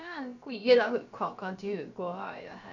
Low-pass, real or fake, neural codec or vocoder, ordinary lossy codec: 7.2 kHz; fake; codec, 16 kHz, about 1 kbps, DyCAST, with the encoder's durations; none